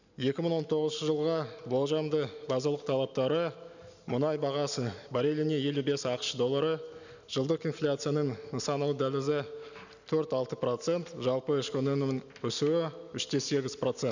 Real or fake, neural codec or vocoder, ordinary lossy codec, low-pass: real; none; none; 7.2 kHz